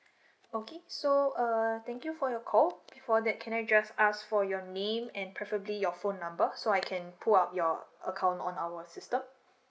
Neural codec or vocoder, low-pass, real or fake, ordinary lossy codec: none; none; real; none